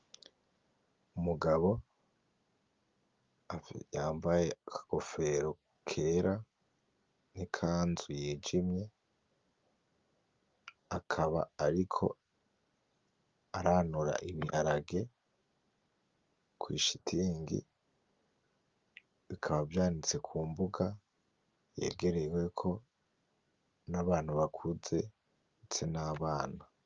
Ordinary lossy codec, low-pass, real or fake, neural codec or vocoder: Opus, 32 kbps; 7.2 kHz; real; none